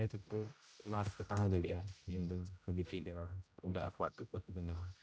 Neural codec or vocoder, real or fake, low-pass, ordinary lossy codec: codec, 16 kHz, 0.5 kbps, X-Codec, HuBERT features, trained on general audio; fake; none; none